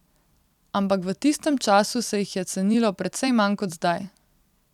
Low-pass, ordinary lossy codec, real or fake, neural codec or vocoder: 19.8 kHz; none; fake; vocoder, 44.1 kHz, 128 mel bands every 256 samples, BigVGAN v2